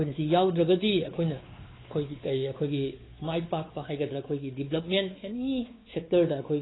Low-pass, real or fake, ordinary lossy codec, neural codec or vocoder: 7.2 kHz; fake; AAC, 16 kbps; codec, 16 kHz, 4 kbps, X-Codec, WavLM features, trained on Multilingual LibriSpeech